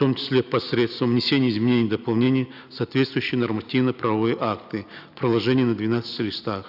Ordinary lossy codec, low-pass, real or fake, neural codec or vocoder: none; 5.4 kHz; real; none